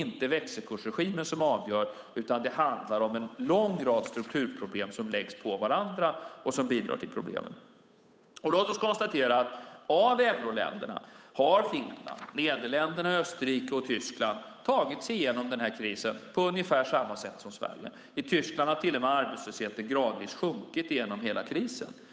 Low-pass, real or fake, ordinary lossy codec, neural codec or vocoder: none; fake; none; codec, 16 kHz, 8 kbps, FunCodec, trained on Chinese and English, 25 frames a second